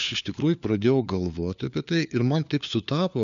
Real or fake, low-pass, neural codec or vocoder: fake; 7.2 kHz; codec, 16 kHz, 4 kbps, FunCodec, trained on LibriTTS, 50 frames a second